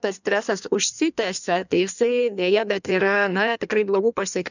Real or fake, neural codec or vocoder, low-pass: fake; codec, 16 kHz in and 24 kHz out, 1.1 kbps, FireRedTTS-2 codec; 7.2 kHz